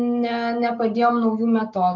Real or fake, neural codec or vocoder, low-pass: real; none; 7.2 kHz